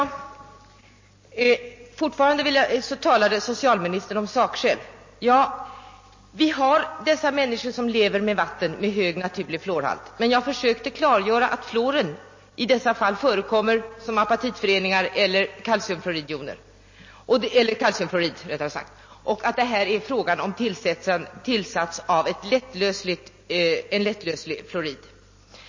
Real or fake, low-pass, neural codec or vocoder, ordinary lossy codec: real; 7.2 kHz; none; MP3, 32 kbps